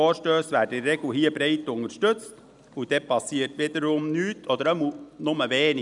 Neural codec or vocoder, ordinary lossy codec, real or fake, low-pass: none; none; real; 10.8 kHz